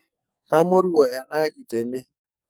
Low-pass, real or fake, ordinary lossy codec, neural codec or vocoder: none; fake; none; codec, 44.1 kHz, 2.6 kbps, SNAC